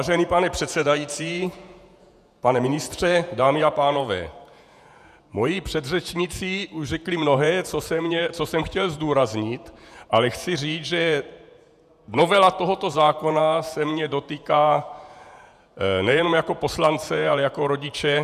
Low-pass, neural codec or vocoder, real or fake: 14.4 kHz; vocoder, 48 kHz, 128 mel bands, Vocos; fake